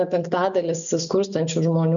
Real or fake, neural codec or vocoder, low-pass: real; none; 7.2 kHz